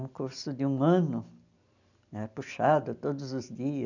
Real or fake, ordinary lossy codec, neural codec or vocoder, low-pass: real; none; none; 7.2 kHz